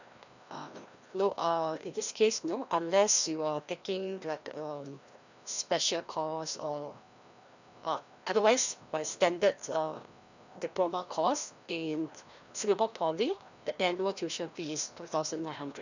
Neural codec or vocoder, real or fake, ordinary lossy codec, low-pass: codec, 16 kHz, 1 kbps, FreqCodec, larger model; fake; none; 7.2 kHz